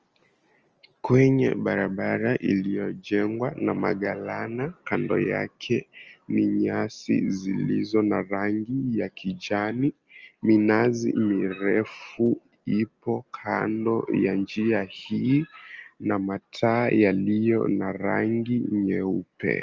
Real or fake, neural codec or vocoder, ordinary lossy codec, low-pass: real; none; Opus, 24 kbps; 7.2 kHz